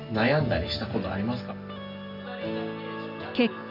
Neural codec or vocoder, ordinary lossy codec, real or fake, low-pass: none; none; real; 5.4 kHz